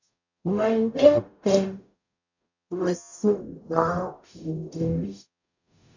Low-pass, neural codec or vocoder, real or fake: 7.2 kHz; codec, 44.1 kHz, 0.9 kbps, DAC; fake